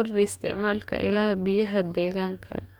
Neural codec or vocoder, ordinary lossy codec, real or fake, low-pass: codec, 44.1 kHz, 2.6 kbps, DAC; none; fake; 19.8 kHz